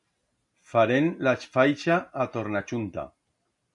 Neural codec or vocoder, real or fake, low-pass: none; real; 10.8 kHz